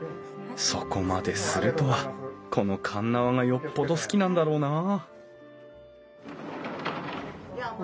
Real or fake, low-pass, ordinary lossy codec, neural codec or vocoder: real; none; none; none